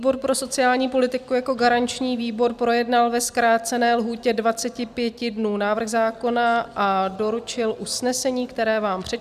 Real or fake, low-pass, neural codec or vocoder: real; 14.4 kHz; none